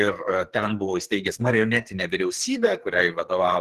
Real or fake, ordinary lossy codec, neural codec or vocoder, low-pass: fake; Opus, 16 kbps; codec, 44.1 kHz, 2.6 kbps, SNAC; 14.4 kHz